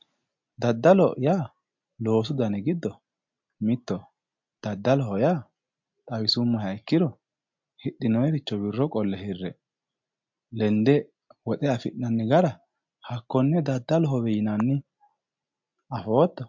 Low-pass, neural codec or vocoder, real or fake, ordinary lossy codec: 7.2 kHz; none; real; MP3, 48 kbps